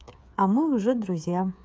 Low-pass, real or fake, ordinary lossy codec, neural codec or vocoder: none; fake; none; codec, 16 kHz, 16 kbps, FreqCodec, smaller model